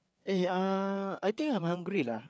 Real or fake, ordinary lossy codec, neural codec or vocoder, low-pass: fake; none; codec, 16 kHz, 4 kbps, FreqCodec, larger model; none